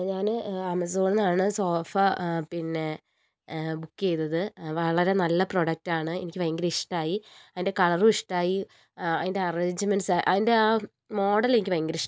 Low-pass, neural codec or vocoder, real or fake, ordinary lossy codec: none; none; real; none